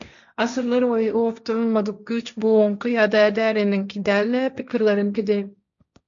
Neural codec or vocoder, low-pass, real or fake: codec, 16 kHz, 1.1 kbps, Voila-Tokenizer; 7.2 kHz; fake